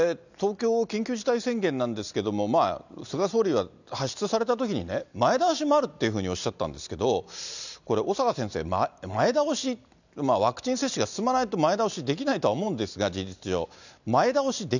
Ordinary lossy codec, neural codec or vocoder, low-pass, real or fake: none; none; 7.2 kHz; real